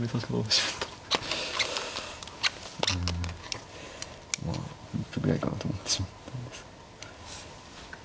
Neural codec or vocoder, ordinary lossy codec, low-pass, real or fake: none; none; none; real